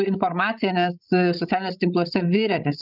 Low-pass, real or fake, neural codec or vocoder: 5.4 kHz; fake; codec, 16 kHz, 16 kbps, FreqCodec, larger model